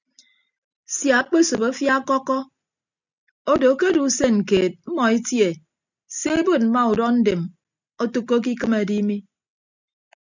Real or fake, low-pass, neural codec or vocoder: real; 7.2 kHz; none